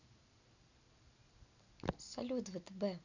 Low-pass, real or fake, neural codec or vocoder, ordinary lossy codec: 7.2 kHz; real; none; none